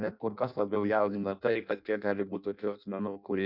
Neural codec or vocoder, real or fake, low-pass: codec, 16 kHz in and 24 kHz out, 0.6 kbps, FireRedTTS-2 codec; fake; 5.4 kHz